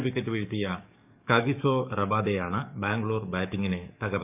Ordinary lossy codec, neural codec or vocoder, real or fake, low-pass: none; codec, 44.1 kHz, 7.8 kbps, DAC; fake; 3.6 kHz